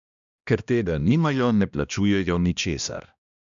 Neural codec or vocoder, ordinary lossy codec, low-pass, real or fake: codec, 16 kHz, 1 kbps, X-Codec, HuBERT features, trained on balanced general audio; none; 7.2 kHz; fake